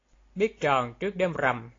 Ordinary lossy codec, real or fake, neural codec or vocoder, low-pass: AAC, 32 kbps; real; none; 7.2 kHz